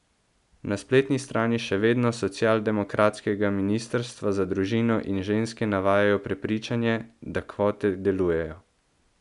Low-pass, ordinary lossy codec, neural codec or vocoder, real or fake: 10.8 kHz; none; none; real